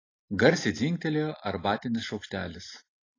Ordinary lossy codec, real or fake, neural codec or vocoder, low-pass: AAC, 32 kbps; real; none; 7.2 kHz